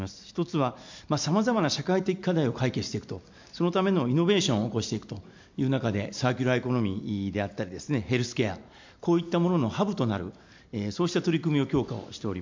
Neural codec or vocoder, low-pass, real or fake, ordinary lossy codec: none; 7.2 kHz; real; MP3, 64 kbps